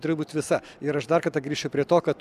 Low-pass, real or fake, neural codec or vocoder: 14.4 kHz; real; none